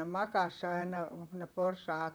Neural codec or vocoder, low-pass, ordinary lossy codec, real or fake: vocoder, 44.1 kHz, 128 mel bands, Pupu-Vocoder; none; none; fake